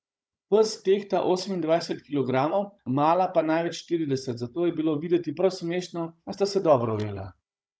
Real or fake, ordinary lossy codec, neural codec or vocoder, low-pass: fake; none; codec, 16 kHz, 16 kbps, FunCodec, trained on Chinese and English, 50 frames a second; none